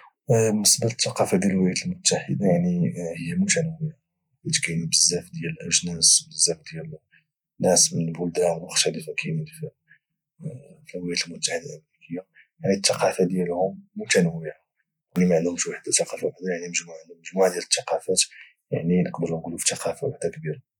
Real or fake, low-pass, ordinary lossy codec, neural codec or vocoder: real; 19.8 kHz; none; none